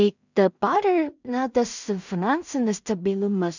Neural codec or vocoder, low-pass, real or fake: codec, 16 kHz in and 24 kHz out, 0.4 kbps, LongCat-Audio-Codec, two codebook decoder; 7.2 kHz; fake